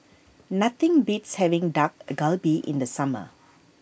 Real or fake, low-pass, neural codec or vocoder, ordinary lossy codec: real; none; none; none